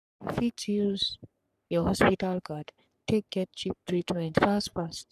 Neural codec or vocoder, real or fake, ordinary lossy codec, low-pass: codec, 44.1 kHz, 3.4 kbps, Pupu-Codec; fake; none; 14.4 kHz